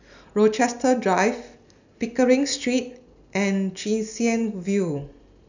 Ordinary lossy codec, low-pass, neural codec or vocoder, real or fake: none; 7.2 kHz; none; real